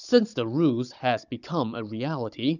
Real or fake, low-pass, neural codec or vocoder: fake; 7.2 kHz; codec, 16 kHz, 16 kbps, FunCodec, trained on Chinese and English, 50 frames a second